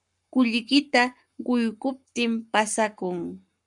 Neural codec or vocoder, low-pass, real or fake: codec, 44.1 kHz, 7.8 kbps, Pupu-Codec; 10.8 kHz; fake